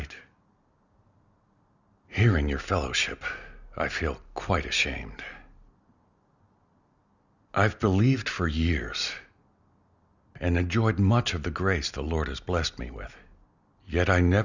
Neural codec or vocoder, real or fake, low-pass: none; real; 7.2 kHz